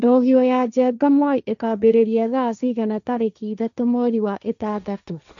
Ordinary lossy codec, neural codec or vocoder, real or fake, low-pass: none; codec, 16 kHz, 1.1 kbps, Voila-Tokenizer; fake; 7.2 kHz